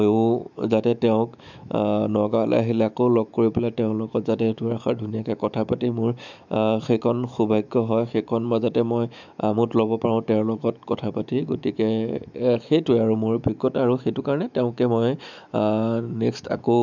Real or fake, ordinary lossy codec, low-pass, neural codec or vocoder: fake; none; 7.2 kHz; autoencoder, 48 kHz, 128 numbers a frame, DAC-VAE, trained on Japanese speech